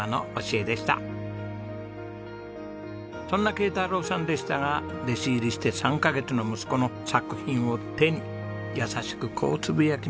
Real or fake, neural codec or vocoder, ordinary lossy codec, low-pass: real; none; none; none